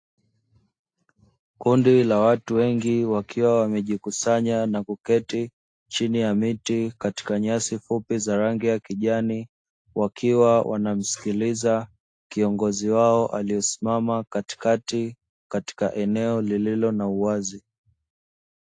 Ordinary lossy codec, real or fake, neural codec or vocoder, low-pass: AAC, 48 kbps; real; none; 9.9 kHz